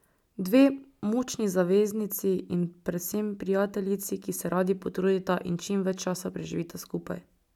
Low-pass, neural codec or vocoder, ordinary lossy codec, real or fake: 19.8 kHz; none; none; real